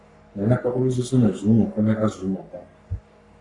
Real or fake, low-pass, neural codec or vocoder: fake; 10.8 kHz; codec, 44.1 kHz, 3.4 kbps, Pupu-Codec